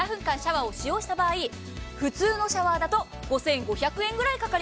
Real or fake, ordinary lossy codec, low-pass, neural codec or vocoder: real; none; none; none